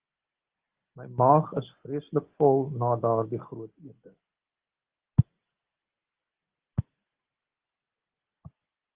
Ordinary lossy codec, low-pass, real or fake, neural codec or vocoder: Opus, 16 kbps; 3.6 kHz; real; none